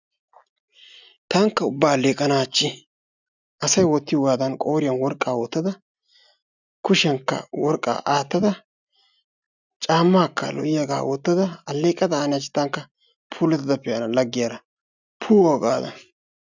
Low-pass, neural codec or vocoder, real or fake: 7.2 kHz; none; real